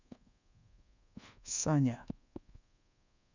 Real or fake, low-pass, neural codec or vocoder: fake; 7.2 kHz; codec, 24 kHz, 1.2 kbps, DualCodec